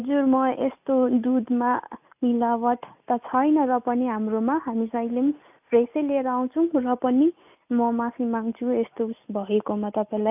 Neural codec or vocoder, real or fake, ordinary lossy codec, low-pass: none; real; AAC, 32 kbps; 3.6 kHz